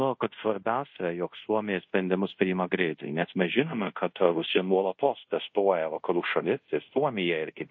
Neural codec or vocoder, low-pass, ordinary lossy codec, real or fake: codec, 24 kHz, 0.5 kbps, DualCodec; 7.2 kHz; MP3, 32 kbps; fake